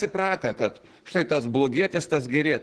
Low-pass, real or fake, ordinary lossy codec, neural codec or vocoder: 10.8 kHz; fake; Opus, 16 kbps; codec, 44.1 kHz, 2.6 kbps, SNAC